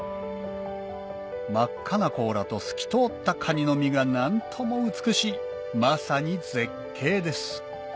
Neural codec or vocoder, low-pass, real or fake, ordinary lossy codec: none; none; real; none